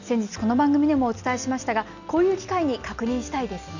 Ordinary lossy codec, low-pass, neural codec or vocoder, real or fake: none; 7.2 kHz; none; real